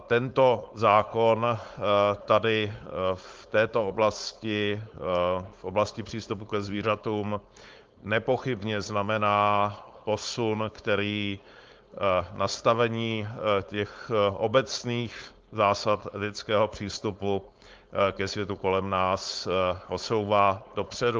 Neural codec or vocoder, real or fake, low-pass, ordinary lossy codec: codec, 16 kHz, 4.8 kbps, FACodec; fake; 7.2 kHz; Opus, 32 kbps